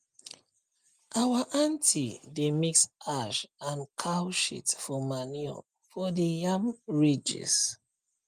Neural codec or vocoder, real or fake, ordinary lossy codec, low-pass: none; real; Opus, 32 kbps; 14.4 kHz